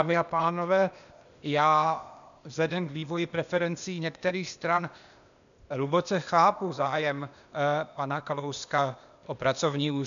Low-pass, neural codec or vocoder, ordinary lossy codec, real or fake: 7.2 kHz; codec, 16 kHz, 0.8 kbps, ZipCodec; MP3, 96 kbps; fake